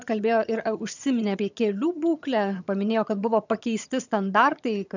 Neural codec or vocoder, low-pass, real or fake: vocoder, 22.05 kHz, 80 mel bands, HiFi-GAN; 7.2 kHz; fake